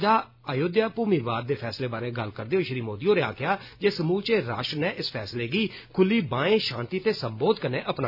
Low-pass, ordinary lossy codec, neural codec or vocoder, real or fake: 5.4 kHz; none; none; real